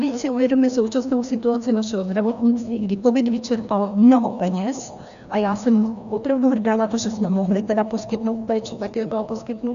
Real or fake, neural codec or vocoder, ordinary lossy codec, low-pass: fake; codec, 16 kHz, 1 kbps, FreqCodec, larger model; MP3, 96 kbps; 7.2 kHz